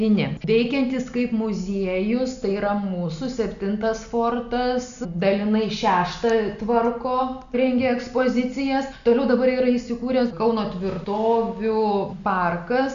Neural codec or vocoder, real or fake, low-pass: none; real; 7.2 kHz